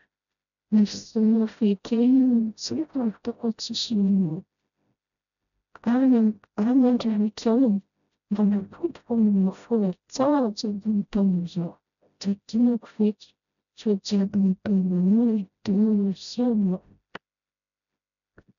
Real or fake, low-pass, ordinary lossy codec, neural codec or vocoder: fake; 7.2 kHz; none; codec, 16 kHz, 0.5 kbps, FreqCodec, smaller model